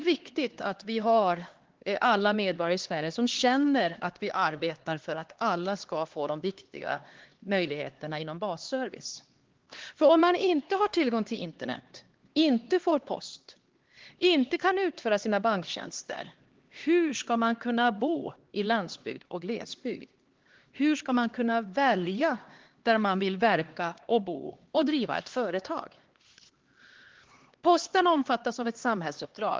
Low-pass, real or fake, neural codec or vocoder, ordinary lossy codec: 7.2 kHz; fake; codec, 16 kHz, 2 kbps, X-Codec, HuBERT features, trained on LibriSpeech; Opus, 16 kbps